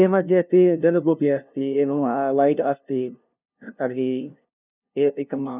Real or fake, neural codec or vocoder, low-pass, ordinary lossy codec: fake; codec, 16 kHz, 0.5 kbps, FunCodec, trained on LibriTTS, 25 frames a second; 3.6 kHz; none